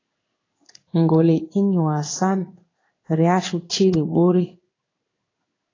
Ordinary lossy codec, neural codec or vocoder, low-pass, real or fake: AAC, 32 kbps; codec, 16 kHz in and 24 kHz out, 1 kbps, XY-Tokenizer; 7.2 kHz; fake